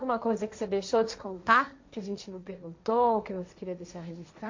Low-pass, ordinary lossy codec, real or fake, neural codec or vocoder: 7.2 kHz; MP3, 48 kbps; fake; codec, 16 kHz, 1.1 kbps, Voila-Tokenizer